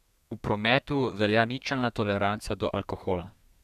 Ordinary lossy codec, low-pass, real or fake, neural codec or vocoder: none; 14.4 kHz; fake; codec, 32 kHz, 1.9 kbps, SNAC